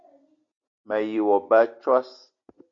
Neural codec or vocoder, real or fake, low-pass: none; real; 7.2 kHz